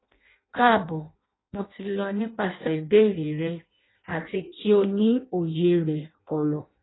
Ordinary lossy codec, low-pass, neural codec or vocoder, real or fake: AAC, 16 kbps; 7.2 kHz; codec, 16 kHz in and 24 kHz out, 0.6 kbps, FireRedTTS-2 codec; fake